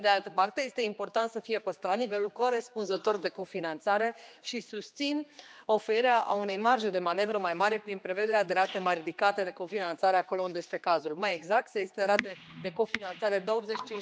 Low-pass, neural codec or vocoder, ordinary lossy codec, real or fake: none; codec, 16 kHz, 2 kbps, X-Codec, HuBERT features, trained on general audio; none; fake